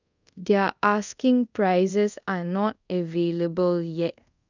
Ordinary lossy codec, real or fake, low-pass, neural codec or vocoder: none; fake; 7.2 kHz; codec, 24 kHz, 0.5 kbps, DualCodec